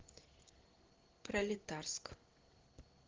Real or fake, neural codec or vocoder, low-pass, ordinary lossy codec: fake; vocoder, 44.1 kHz, 128 mel bands every 512 samples, BigVGAN v2; 7.2 kHz; Opus, 16 kbps